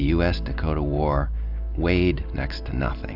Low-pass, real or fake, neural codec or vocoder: 5.4 kHz; real; none